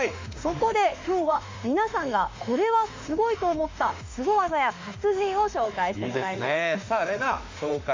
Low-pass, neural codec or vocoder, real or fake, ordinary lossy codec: 7.2 kHz; autoencoder, 48 kHz, 32 numbers a frame, DAC-VAE, trained on Japanese speech; fake; MP3, 64 kbps